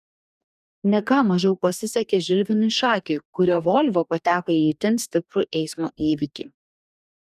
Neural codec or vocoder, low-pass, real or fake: codec, 44.1 kHz, 2.6 kbps, DAC; 14.4 kHz; fake